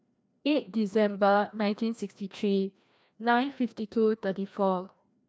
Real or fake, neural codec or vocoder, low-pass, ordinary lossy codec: fake; codec, 16 kHz, 1 kbps, FreqCodec, larger model; none; none